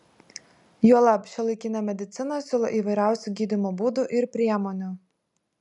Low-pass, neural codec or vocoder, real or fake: 10.8 kHz; none; real